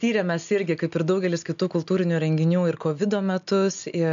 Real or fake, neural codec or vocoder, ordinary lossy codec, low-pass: real; none; MP3, 96 kbps; 7.2 kHz